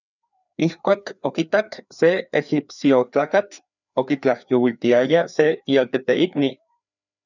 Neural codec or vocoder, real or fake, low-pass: codec, 16 kHz, 2 kbps, FreqCodec, larger model; fake; 7.2 kHz